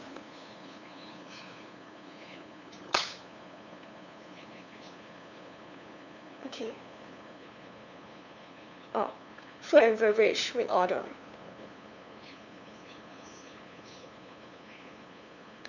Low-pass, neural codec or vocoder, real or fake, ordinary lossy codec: 7.2 kHz; codec, 16 kHz, 2 kbps, FunCodec, trained on LibriTTS, 25 frames a second; fake; none